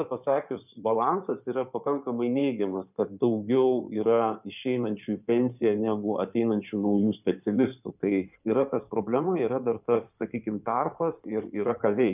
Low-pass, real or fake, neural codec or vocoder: 3.6 kHz; fake; codec, 16 kHz in and 24 kHz out, 2.2 kbps, FireRedTTS-2 codec